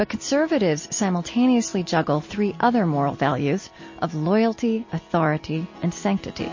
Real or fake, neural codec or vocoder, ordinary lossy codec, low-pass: real; none; MP3, 32 kbps; 7.2 kHz